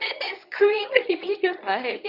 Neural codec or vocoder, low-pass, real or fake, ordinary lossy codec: codec, 24 kHz, 0.9 kbps, WavTokenizer, medium speech release version 1; 5.4 kHz; fake; none